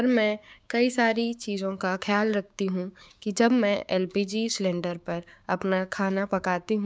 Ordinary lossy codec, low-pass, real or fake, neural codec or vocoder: none; none; fake; codec, 16 kHz, 6 kbps, DAC